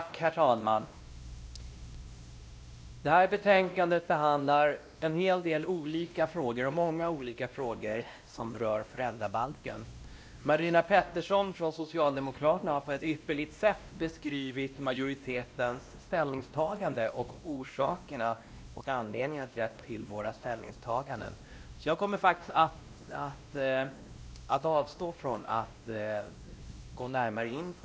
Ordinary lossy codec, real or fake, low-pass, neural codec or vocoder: none; fake; none; codec, 16 kHz, 1 kbps, X-Codec, WavLM features, trained on Multilingual LibriSpeech